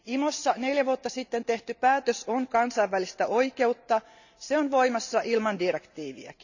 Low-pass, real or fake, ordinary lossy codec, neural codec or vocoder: 7.2 kHz; real; none; none